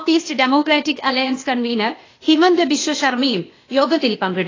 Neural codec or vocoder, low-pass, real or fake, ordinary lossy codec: codec, 16 kHz, 0.8 kbps, ZipCodec; 7.2 kHz; fake; AAC, 32 kbps